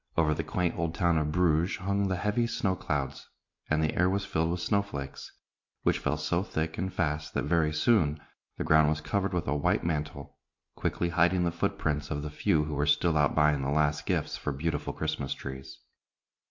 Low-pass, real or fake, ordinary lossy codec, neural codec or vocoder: 7.2 kHz; real; MP3, 48 kbps; none